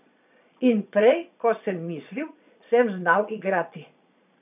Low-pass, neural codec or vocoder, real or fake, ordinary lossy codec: 3.6 kHz; vocoder, 22.05 kHz, 80 mel bands, Vocos; fake; none